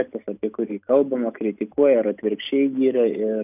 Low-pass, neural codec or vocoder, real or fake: 3.6 kHz; none; real